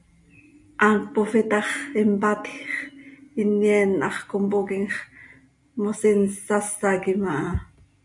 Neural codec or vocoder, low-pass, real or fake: none; 10.8 kHz; real